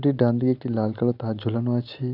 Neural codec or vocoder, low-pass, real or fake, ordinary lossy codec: none; 5.4 kHz; real; none